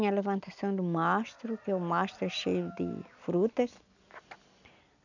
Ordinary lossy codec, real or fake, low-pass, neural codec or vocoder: none; real; 7.2 kHz; none